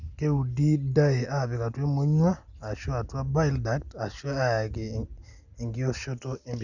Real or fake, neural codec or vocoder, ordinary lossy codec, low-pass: real; none; none; 7.2 kHz